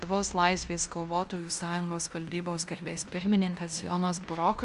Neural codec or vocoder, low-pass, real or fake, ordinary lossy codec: codec, 16 kHz in and 24 kHz out, 0.9 kbps, LongCat-Audio-Codec, fine tuned four codebook decoder; 10.8 kHz; fake; Opus, 64 kbps